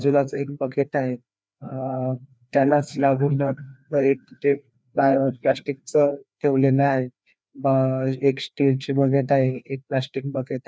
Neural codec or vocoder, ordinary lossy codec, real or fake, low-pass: codec, 16 kHz, 2 kbps, FreqCodec, larger model; none; fake; none